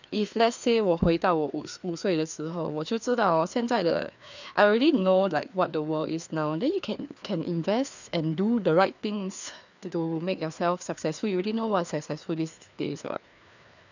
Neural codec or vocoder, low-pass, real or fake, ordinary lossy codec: codec, 16 kHz, 2 kbps, FreqCodec, larger model; 7.2 kHz; fake; none